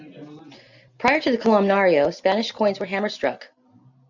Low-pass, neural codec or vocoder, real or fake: 7.2 kHz; none; real